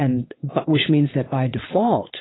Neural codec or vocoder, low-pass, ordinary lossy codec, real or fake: vocoder, 22.05 kHz, 80 mel bands, WaveNeXt; 7.2 kHz; AAC, 16 kbps; fake